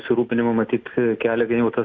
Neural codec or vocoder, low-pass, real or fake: none; 7.2 kHz; real